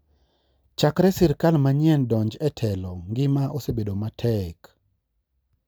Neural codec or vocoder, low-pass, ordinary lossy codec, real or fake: none; none; none; real